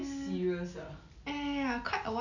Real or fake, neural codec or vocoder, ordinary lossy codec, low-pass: real; none; none; 7.2 kHz